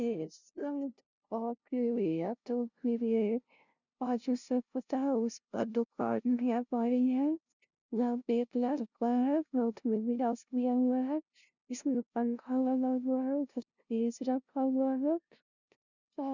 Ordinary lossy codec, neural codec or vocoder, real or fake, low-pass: none; codec, 16 kHz, 0.5 kbps, FunCodec, trained on LibriTTS, 25 frames a second; fake; 7.2 kHz